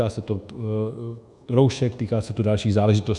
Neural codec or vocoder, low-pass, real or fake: codec, 24 kHz, 1.2 kbps, DualCodec; 10.8 kHz; fake